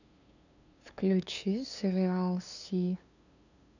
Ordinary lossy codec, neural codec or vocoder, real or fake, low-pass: none; codec, 16 kHz, 2 kbps, FunCodec, trained on LibriTTS, 25 frames a second; fake; 7.2 kHz